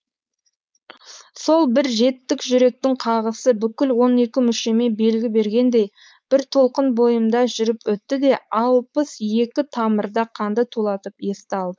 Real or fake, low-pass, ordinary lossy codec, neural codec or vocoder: fake; none; none; codec, 16 kHz, 4.8 kbps, FACodec